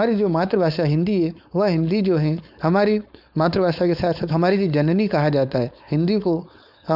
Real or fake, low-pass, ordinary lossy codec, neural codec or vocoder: fake; 5.4 kHz; none; codec, 16 kHz, 4.8 kbps, FACodec